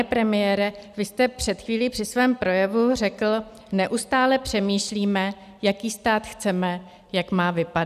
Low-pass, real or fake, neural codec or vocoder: 14.4 kHz; real; none